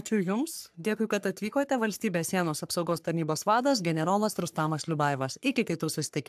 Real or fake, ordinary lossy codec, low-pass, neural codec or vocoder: fake; AAC, 96 kbps; 14.4 kHz; codec, 44.1 kHz, 3.4 kbps, Pupu-Codec